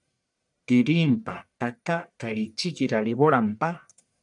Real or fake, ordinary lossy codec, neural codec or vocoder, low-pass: fake; MP3, 96 kbps; codec, 44.1 kHz, 1.7 kbps, Pupu-Codec; 10.8 kHz